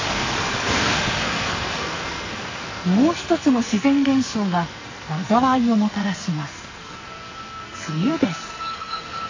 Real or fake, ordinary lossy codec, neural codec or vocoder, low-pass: fake; AAC, 32 kbps; codec, 44.1 kHz, 2.6 kbps, SNAC; 7.2 kHz